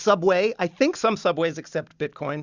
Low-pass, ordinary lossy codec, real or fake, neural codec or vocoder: 7.2 kHz; Opus, 64 kbps; real; none